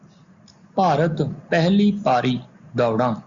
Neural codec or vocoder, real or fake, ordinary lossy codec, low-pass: none; real; Opus, 64 kbps; 7.2 kHz